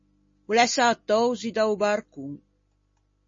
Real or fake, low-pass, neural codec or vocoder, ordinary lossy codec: real; 7.2 kHz; none; MP3, 32 kbps